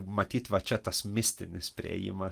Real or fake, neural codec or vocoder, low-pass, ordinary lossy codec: real; none; 14.4 kHz; Opus, 24 kbps